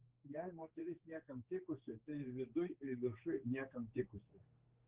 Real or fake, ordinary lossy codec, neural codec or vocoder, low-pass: fake; Opus, 16 kbps; codec, 16 kHz, 4 kbps, X-Codec, HuBERT features, trained on general audio; 3.6 kHz